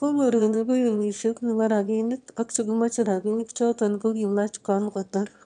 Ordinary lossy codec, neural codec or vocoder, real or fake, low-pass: none; autoencoder, 22.05 kHz, a latent of 192 numbers a frame, VITS, trained on one speaker; fake; 9.9 kHz